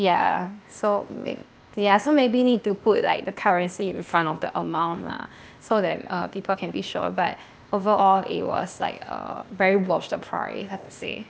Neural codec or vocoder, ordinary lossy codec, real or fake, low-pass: codec, 16 kHz, 0.8 kbps, ZipCodec; none; fake; none